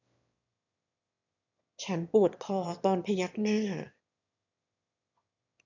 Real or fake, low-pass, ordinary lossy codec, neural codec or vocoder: fake; 7.2 kHz; none; autoencoder, 22.05 kHz, a latent of 192 numbers a frame, VITS, trained on one speaker